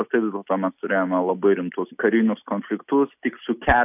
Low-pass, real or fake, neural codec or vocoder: 3.6 kHz; real; none